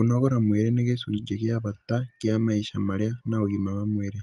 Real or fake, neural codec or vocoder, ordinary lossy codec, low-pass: real; none; Opus, 32 kbps; 10.8 kHz